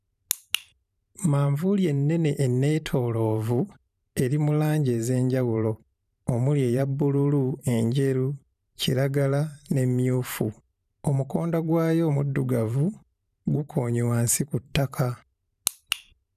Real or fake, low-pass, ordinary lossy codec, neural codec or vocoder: fake; 14.4 kHz; none; vocoder, 44.1 kHz, 128 mel bands every 512 samples, BigVGAN v2